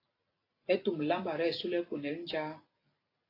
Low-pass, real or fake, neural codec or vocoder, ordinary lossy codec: 5.4 kHz; real; none; AAC, 24 kbps